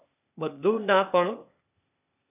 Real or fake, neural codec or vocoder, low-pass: fake; codec, 16 kHz, 0.8 kbps, ZipCodec; 3.6 kHz